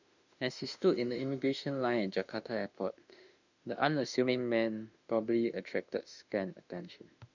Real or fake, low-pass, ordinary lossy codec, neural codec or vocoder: fake; 7.2 kHz; none; autoencoder, 48 kHz, 32 numbers a frame, DAC-VAE, trained on Japanese speech